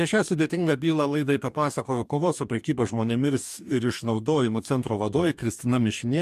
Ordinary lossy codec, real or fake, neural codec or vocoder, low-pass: MP3, 96 kbps; fake; codec, 44.1 kHz, 2.6 kbps, DAC; 14.4 kHz